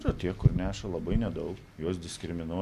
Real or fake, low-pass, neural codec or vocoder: real; 14.4 kHz; none